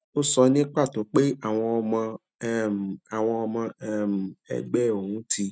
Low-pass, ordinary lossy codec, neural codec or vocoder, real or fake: none; none; none; real